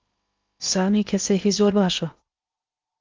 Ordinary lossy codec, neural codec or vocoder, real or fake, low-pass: Opus, 24 kbps; codec, 16 kHz in and 24 kHz out, 0.6 kbps, FocalCodec, streaming, 2048 codes; fake; 7.2 kHz